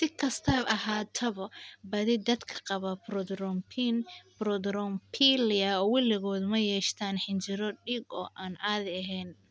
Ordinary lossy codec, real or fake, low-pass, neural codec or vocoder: none; real; none; none